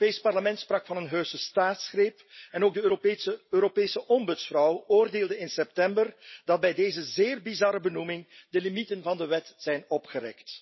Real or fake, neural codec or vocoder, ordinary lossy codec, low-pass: real; none; MP3, 24 kbps; 7.2 kHz